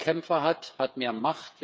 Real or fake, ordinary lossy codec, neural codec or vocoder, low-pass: fake; none; codec, 16 kHz, 8 kbps, FreqCodec, smaller model; none